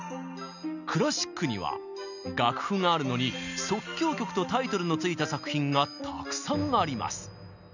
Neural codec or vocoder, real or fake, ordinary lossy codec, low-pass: none; real; none; 7.2 kHz